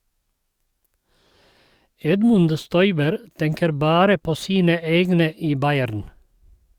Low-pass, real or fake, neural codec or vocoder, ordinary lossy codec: 19.8 kHz; fake; codec, 44.1 kHz, 7.8 kbps, DAC; Opus, 64 kbps